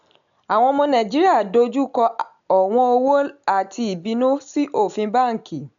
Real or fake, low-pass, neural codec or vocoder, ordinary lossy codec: real; 7.2 kHz; none; none